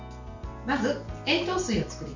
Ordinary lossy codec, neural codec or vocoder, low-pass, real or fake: none; none; 7.2 kHz; real